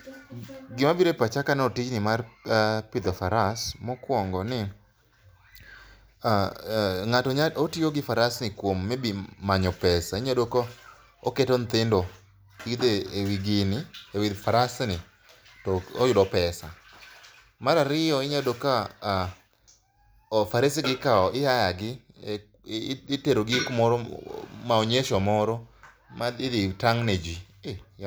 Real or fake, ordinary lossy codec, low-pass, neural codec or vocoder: real; none; none; none